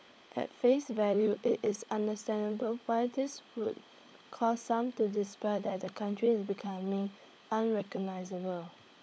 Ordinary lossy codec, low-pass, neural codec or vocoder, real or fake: none; none; codec, 16 kHz, 16 kbps, FunCodec, trained on LibriTTS, 50 frames a second; fake